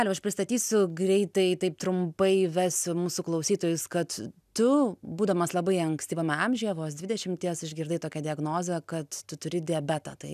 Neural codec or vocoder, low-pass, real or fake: none; 14.4 kHz; real